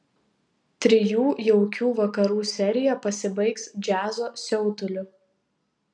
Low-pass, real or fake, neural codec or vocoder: 9.9 kHz; real; none